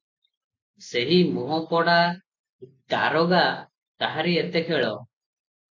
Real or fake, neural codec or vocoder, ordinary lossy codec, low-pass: real; none; MP3, 48 kbps; 7.2 kHz